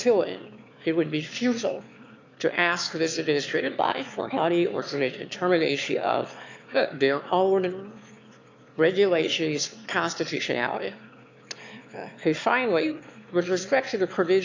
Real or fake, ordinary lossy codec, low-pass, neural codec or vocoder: fake; MP3, 64 kbps; 7.2 kHz; autoencoder, 22.05 kHz, a latent of 192 numbers a frame, VITS, trained on one speaker